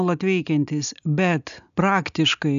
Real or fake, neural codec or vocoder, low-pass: real; none; 7.2 kHz